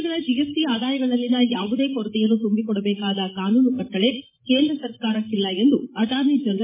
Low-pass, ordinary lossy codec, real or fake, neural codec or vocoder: 3.6 kHz; MP3, 16 kbps; fake; codec, 16 kHz, 16 kbps, FreqCodec, larger model